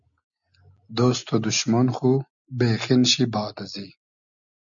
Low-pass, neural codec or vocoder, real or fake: 7.2 kHz; none; real